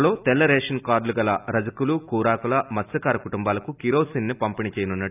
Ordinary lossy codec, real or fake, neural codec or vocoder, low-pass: none; real; none; 3.6 kHz